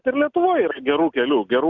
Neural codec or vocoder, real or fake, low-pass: none; real; 7.2 kHz